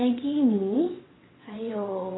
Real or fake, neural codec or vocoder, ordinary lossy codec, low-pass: fake; vocoder, 22.05 kHz, 80 mel bands, WaveNeXt; AAC, 16 kbps; 7.2 kHz